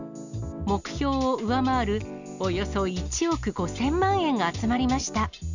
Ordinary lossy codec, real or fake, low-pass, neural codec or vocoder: none; real; 7.2 kHz; none